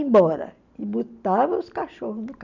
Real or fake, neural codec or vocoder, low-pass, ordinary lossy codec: real; none; 7.2 kHz; none